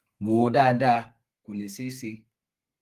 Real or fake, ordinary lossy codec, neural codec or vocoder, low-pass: fake; Opus, 24 kbps; codec, 32 kHz, 1.9 kbps, SNAC; 14.4 kHz